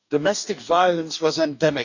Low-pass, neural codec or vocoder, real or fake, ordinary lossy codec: 7.2 kHz; codec, 44.1 kHz, 2.6 kbps, DAC; fake; none